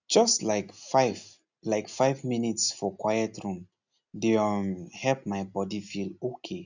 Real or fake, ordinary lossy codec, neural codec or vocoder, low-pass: real; none; none; 7.2 kHz